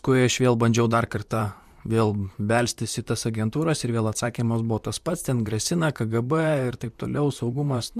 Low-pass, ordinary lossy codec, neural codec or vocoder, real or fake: 14.4 kHz; MP3, 96 kbps; vocoder, 44.1 kHz, 128 mel bands, Pupu-Vocoder; fake